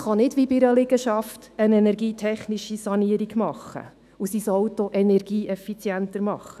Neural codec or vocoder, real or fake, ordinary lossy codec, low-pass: autoencoder, 48 kHz, 128 numbers a frame, DAC-VAE, trained on Japanese speech; fake; none; 14.4 kHz